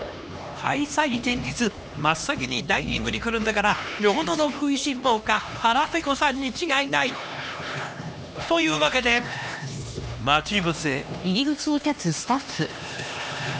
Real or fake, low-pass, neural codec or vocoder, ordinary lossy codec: fake; none; codec, 16 kHz, 2 kbps, X-Codec, HuBERT features, trained on LibriSpeech; none